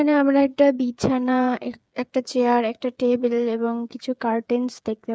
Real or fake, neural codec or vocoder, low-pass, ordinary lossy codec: fake; codec, 16 kHz, 8 kbps, FreqCodec, smaller model; none; none